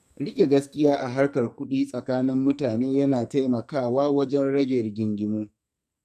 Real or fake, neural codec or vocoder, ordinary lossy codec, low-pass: fake; codec, 44.1 kHz, 2.6 kbps, SNAC; none; 14.4 kHz